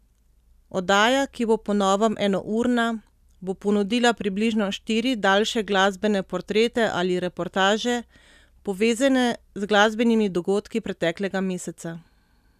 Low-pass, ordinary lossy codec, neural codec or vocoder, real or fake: 14.4 kHz; none; none; real